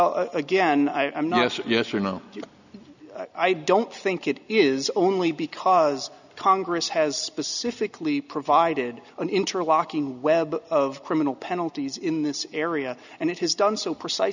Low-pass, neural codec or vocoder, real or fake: 7.2 kHz; none; real